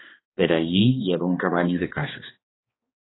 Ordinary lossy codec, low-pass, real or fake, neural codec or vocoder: AAC, 16 kbps; 7.2 kHz; fake; codec, 16 kHz, 2 kbps, X-Codec, HuBERT features, trained on balanced general audio